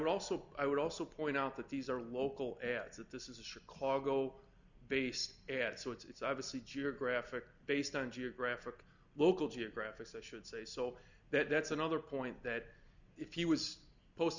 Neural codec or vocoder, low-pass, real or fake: none; 7.2 kHz; real